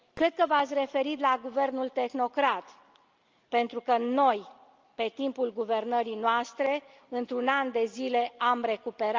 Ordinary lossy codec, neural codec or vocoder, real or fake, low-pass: Opus, 24 kbps; none; real; 7.2 kHz